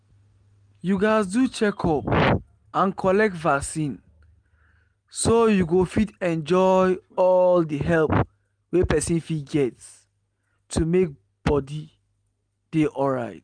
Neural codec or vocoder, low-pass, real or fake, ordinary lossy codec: none; 9.9 kHz; real; Opus, 32 kbps